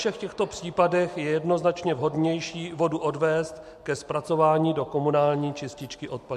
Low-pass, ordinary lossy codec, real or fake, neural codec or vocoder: 14.4 kHz; MP3, 64 kbps; real; none